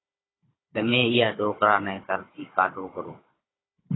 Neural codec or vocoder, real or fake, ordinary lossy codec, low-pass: codec, 16 kHz, 16 kbps, FunCodec, trained on Chinese and English, 50 frames a second; fake; AAC, 16 kbps; 7.2 kHz